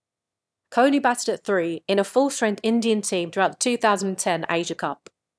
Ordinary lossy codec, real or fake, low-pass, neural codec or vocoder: none; fake; none; autoencoder, 22.05 kHz, a latent of 192 numbers a frame, VITS, trained on one speaker